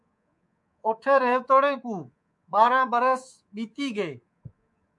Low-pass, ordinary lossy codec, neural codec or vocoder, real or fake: 10.8 kHz; MP3, 64 kbps; codec, 24 kHz, 3.1 kbps, DualCodec; fake